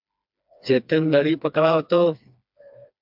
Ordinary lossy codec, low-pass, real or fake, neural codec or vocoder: MP3, 48 kbps; 5.4 kHz; fake; codec, 16 kHz, 2 kbps, FreqCodec, smaller model